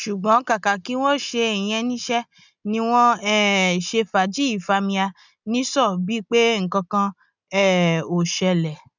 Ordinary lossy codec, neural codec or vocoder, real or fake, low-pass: none; none; real; 7.2 kHz